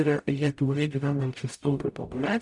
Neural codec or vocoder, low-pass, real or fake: codec, 44.1 kHz, 0.9 kbps, DAC; 10.8 kHz; fake